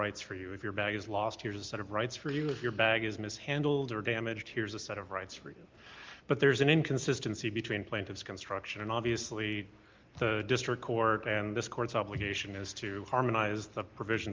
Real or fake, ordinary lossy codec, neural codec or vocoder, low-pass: real; Opus, 32 kbps; none; 7.2 kHz